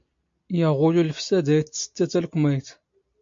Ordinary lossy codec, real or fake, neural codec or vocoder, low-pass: MP3, 48 kbps; real; none; 7.2 kHz